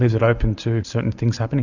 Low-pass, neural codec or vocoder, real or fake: 7.2 kHz; none; real